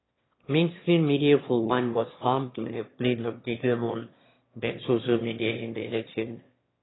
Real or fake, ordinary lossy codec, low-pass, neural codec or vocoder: fake; AAC, 16 kbps; 7.2 kHz; autoencoder, 22.05 kHz, a latent of 192 numbers a frame, VITS, trained on one speaker